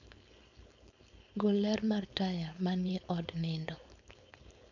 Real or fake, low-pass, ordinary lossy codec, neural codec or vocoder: fake; 7.2 kHz; none; codec, 16 kHz, 4.8 kbps, FACodec